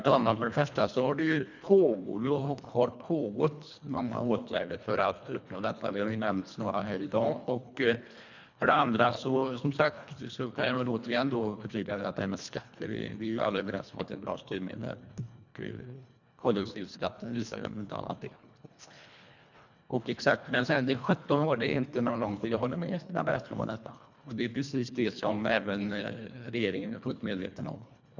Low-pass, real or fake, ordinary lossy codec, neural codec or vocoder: 7.2 kHz; fake; none; codec, 24 kHz, 1.5 kbps, HILCodec